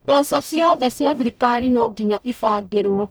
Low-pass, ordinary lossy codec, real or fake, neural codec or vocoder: none; none; fake; codec, 44.1 kHz, 0.9 kbps, DAC